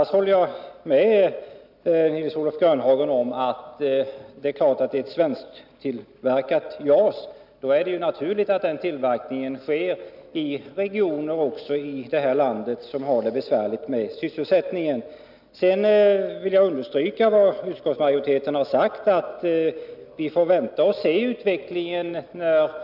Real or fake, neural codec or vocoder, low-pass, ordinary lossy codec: real; none; 5.4 kHz; none